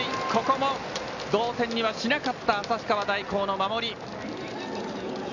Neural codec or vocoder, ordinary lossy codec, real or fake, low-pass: none; none; real; 7.2 kHz